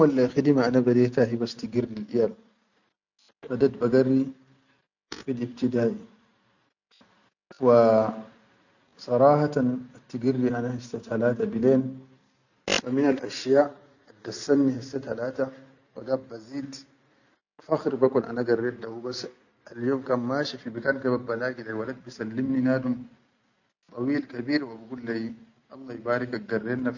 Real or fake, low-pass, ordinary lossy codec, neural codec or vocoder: real; 7.2 kHz; none; none